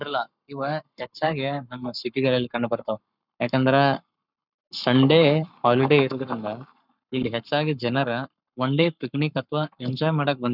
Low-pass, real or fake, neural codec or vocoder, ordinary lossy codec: 5.4 kHz; fake; codec, 44.1 kHz, 7.8 kbps, Pupu-Codec; none